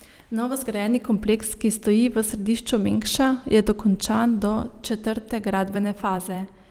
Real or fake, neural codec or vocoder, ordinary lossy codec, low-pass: fake; vocoder, 44.1 kHz, 128 mel bands every 256 samples, BigVGAN v2; Opus, 24 kbps; 19.8 kHz